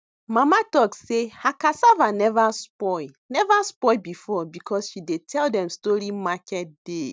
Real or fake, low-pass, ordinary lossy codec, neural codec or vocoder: real; none; none; none